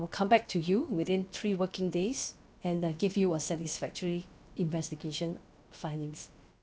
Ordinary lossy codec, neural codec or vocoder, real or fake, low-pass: none; codec, 16 kHz, about 1 kbps, DyCAST, with the encoder's durations; fake; none